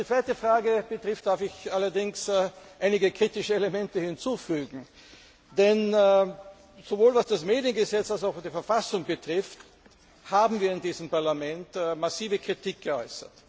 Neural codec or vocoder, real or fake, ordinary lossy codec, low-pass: none; real; none; none